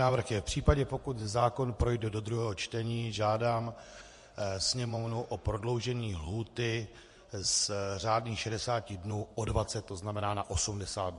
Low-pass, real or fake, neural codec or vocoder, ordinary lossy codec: 10.8 kHz; fake; vocoder, 24 kHz, 100 mel bands, Vocos; MP3, 48 kbps